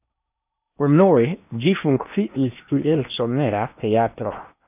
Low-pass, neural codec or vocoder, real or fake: 3.6 kHz; codec, 16 kHz in and 24 kHz out, 0.8 kbps, FocalCodec, streaming, 65536 codes; fake